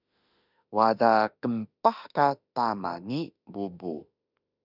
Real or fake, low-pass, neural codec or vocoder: fake; 5.4 kHz; autoencoder, 48 kHz, 32 numbers a frame, DAC-VAE, trained on Japanese speech